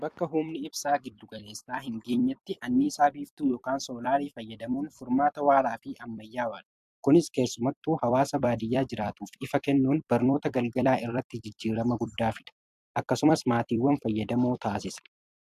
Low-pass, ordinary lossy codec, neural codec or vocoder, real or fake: 14.4 kHz; AAC, 96 kbps; vocoder, 44.1 kHz, 128 mel bands every 256 samples, BigVGAN v2; fake